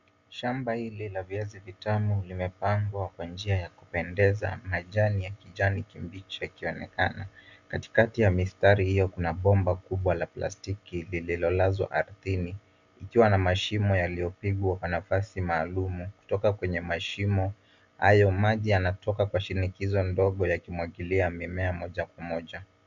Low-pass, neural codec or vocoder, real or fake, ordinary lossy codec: 7.2 kHz; none; real; Opus, 64 kbps